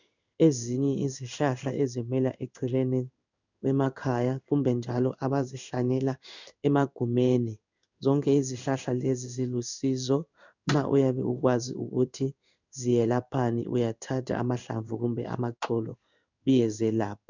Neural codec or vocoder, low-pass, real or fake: codec, 16 kHz in and 24 kHz out, 1 kbps, XY-Tokenizer; 7.2 kHz; fake